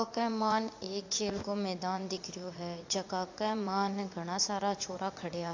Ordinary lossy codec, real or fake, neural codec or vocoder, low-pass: AAC, 48 kbps; fake; vocoder, 44.1 kHz, 80 mel bands, Vocos; 7.2 kHz